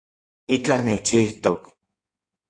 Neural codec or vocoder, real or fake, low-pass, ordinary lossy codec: codec, 16 kHz in and 24 kHz out, 1.1 kbps, FireRedTTS-2 codec; fake; 9.9 kHz; AAC, 48 kbps